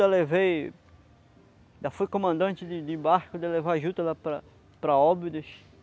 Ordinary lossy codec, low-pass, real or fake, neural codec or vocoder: none; none; real; none